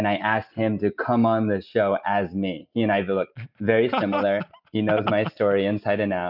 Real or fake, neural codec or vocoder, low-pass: real; none; 5.4 kHz